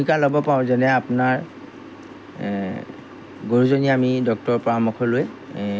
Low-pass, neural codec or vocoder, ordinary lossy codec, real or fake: none; none; none; real